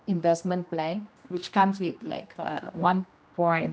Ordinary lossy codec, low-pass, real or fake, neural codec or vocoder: none; none; fake; codec, 16 kHz, 1 kbps, X-Codec, HuBERT features, trained on general audio